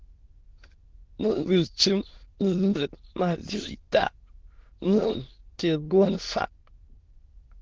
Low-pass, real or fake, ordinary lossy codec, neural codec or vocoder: 7.2 kHz; fake; Opus, 16 kbps; autoencoder, 22.05 kHz, a latent of 192 numbers a frame, VITS, trained on many speakers